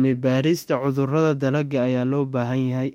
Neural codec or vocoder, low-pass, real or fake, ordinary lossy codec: autoencoder, 48 kHz, 32 numbers a frame, DAC-VAE, trained on Japanese speech; 19.8 kHz; fake; MP3, 64 kbps